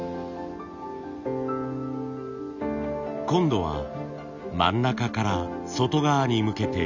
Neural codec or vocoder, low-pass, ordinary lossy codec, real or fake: none; 7.2 kHz; none; real